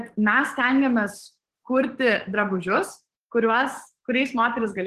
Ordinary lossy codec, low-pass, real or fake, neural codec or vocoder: Opus, 16 kbps; 14.4 kHz; fake; codec, 44.1 kHz, 7.8 kbps, DAC